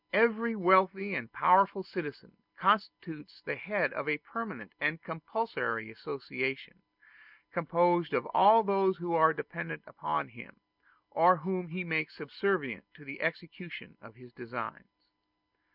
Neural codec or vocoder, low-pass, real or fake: none; 5.4 kHz; real